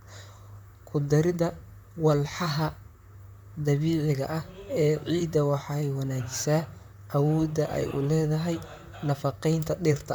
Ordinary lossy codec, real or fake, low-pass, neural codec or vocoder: none; fake; none; vocoder, 44.1 kHz, 128 mel bands, Pupu-Vocoder